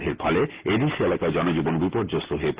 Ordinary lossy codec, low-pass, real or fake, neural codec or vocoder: Opus, 16 kbps; 3.6 kHz; real; none